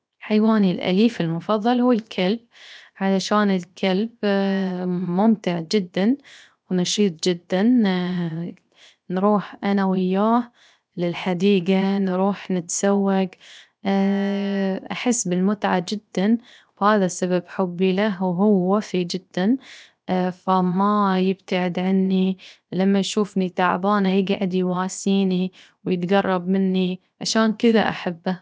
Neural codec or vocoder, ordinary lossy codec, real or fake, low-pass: codec, 16 kHz, 0.7 kbps, FocalCodec; none; fake; none